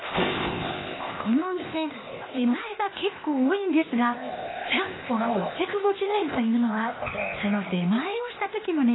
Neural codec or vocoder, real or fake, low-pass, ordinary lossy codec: codec, 16 kHz, 0.8 kbps, ZipCodec; fake; 7.2 kHz; AAC, 16 kbps